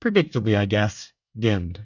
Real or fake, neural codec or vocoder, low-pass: fake; codec, 24 kHz, 1 kbps, SNAC; 7.2 kHz